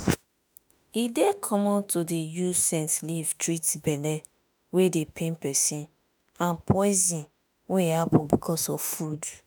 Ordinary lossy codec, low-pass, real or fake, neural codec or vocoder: none; none; fake; autoencoder, 48 kHz, 32 numbers a frame, DAC-VAE, trained on Japanese speech